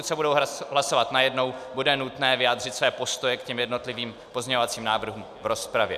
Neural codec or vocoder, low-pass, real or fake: none; 14.4 kHz; real